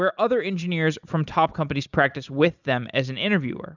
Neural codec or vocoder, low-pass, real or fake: none; 7.2 kHz; real